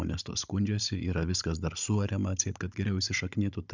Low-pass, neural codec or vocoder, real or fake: 7.2 kHz; codec, 16 kHz, 16 kbps, FunCodec, trained on Chinese and English, 50 frames a second; fake